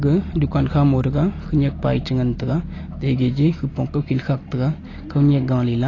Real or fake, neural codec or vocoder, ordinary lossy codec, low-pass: real; none; AAC, 32 kbps; 7.2 kHz